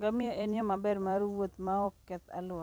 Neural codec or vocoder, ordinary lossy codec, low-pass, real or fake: vocoder, 44.1 kHz, 128 mel bands every 256 samples, BigVGAN v2; none; none; fake